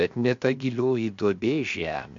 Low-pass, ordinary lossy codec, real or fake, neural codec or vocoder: 7.2 kHz; MP3, 48 kbps; fake; codec, 16 kHz, 0.7 kbps, FocalCodec